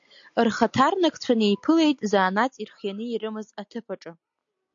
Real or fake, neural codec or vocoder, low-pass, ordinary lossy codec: real; none; 7.2 kHz; AAC, 64 kbps